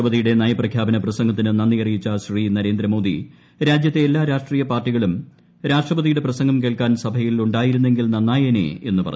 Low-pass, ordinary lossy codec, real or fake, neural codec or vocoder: none; none; real; none